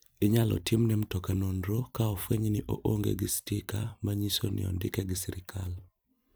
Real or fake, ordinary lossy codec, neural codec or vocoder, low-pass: fake; none; vocoder, 44.1 kHz, 128 mel bands every 256 samples, BigVGAN v2; none